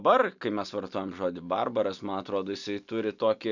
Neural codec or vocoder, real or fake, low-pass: none; real; 7.2 kHz